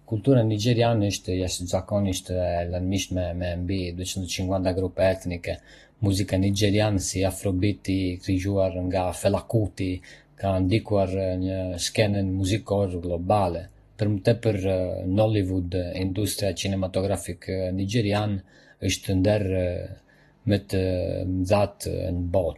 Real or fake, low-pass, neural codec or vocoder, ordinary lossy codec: real; 14.4 kHz; none; AAC, 32 kbps